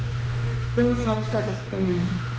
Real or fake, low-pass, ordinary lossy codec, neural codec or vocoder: fake; none; none; codec, 16 kHz, 1 kbps, X-Codec, HuBERT features, trained on general audio